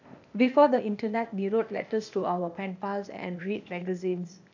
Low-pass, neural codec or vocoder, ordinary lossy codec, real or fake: 7.2 kHz; codec, 16 kHz, 0.8 kbps, ZipCodec; none; fake